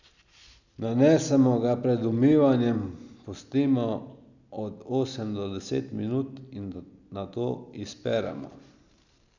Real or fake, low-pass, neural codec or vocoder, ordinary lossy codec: real; 7.2 kHz; none; none